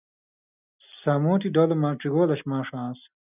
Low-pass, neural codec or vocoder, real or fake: 3.6 kHz; none; real